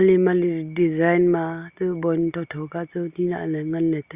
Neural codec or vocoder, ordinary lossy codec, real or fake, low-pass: none; Opus, 64 kbps; real; 3.6 kHz